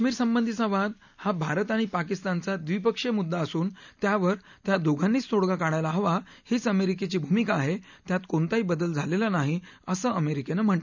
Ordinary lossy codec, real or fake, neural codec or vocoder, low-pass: none; real; none; 7.2 kHz